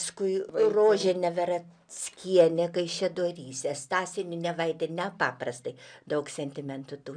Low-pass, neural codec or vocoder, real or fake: 9.9 kHz; none; real